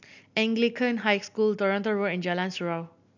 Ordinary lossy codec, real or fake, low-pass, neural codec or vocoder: none; real; 7.2 kHz; none